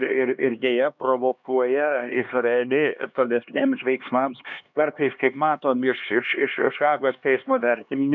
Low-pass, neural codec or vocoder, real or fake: 7.2 kHz; codec, 16 kHz, 2 kbps, X-Codec, HuBERT features, trained on LibriSpeech; fake